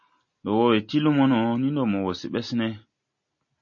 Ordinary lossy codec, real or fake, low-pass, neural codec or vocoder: MP3, 32 kbps; real; 7.2 kHz; none